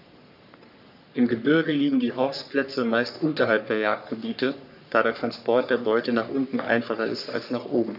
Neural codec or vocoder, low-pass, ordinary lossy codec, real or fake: codec, 44.1 kHz, 3.4 kbps, Pupu-Codec; 5.4 kHz; none; fake